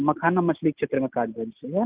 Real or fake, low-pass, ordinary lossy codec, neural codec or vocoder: real; 3.6 kHz; Opus, 24 kbps; none